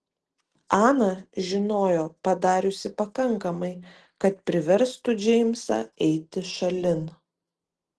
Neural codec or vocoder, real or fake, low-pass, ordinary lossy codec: none; real; 10.8 kHz; Opus, 16 kbps